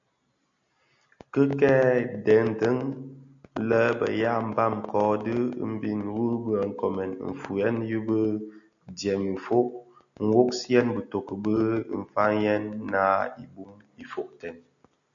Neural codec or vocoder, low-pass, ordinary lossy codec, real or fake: none; 7.2 kHz; MP3, 96 kbps; real